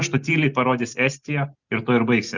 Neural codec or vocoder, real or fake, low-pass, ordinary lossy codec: none; real; 7.2 kHz; Opus, 64 kbps